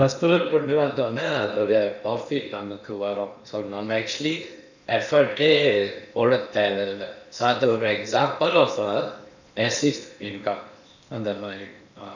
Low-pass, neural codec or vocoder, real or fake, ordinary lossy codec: 7.2 kHz; codec, 16 kHz in and 24 kHz out, 0.8 kbps, FocalCodec, streaming, 65536 codes; fake; none